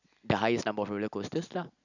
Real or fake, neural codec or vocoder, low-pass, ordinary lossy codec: real; none; 7.2 kHz; none